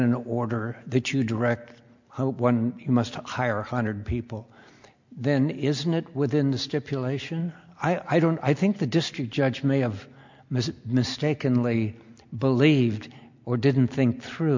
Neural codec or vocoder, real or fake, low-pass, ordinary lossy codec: none; real; 7.2 kHz; MP3, 64 kbps